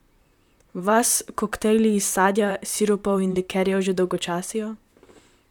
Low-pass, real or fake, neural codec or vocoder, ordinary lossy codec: 19.8 kHz; fake; vocoder, 44.1 kHz, 128 mel bands, Pupu-Vocoder; none